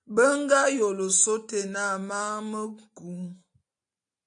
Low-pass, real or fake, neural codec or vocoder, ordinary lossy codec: 9.9 kHz; real; none; AAC, 64 kbps